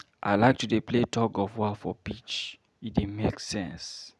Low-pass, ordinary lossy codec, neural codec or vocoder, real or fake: none; none; none; real